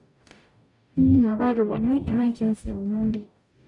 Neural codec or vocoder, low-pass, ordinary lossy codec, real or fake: codec, 44.1 kHz, 0.9 kbps, DAC; 10.8 kHz; none; fake